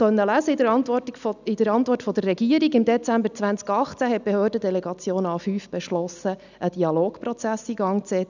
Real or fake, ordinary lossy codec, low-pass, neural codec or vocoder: real; none; 7.2 kHz; none